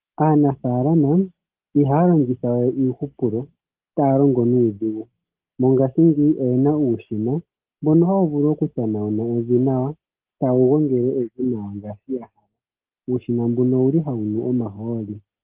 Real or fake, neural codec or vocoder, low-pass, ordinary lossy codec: real; none; 3.6 kHz; Opus, 16 kbps